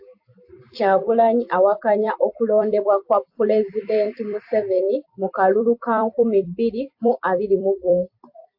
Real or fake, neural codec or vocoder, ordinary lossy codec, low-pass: fake; vocoder, 44.1 kHz, 128 mel bands every 256 samples, BigVGAN v2; AAC, 48 kbps; 5.4 kHz